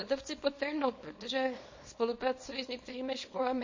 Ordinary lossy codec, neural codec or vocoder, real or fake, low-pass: MP3, 32 kbps; codec, 24 kHz, 0.9 kbps, WavTokenizer, small release; fake; 7.2 kHz